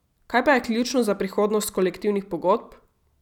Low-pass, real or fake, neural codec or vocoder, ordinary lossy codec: 19.8 kHz; real; none; none